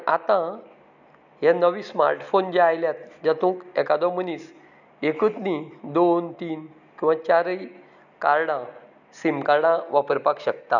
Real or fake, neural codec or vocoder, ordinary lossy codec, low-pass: real; none; none; 7.2 kHz